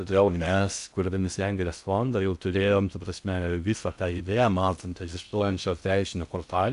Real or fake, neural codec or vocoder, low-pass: fake; codec, 16 kHz in and 24 kHz out, 0.6 kbps, FocalCodec, streaming, 4096 codes; 10.8 kHz